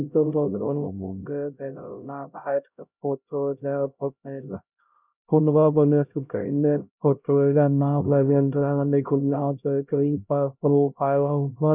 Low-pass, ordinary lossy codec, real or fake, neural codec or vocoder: 3.6 kHz; none; fake; codec, 16 kHz, 0.5 kbps, X-Codec, HuBERT features, trained on LibriSpeech